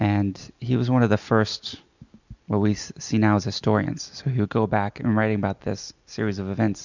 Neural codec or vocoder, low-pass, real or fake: none; 7.2 kHz; real